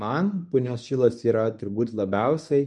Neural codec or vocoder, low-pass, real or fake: codec, 24 kHz, 0.9 kbps, WavTokenizer, medium speech release version 1; 10.8 kHz; fake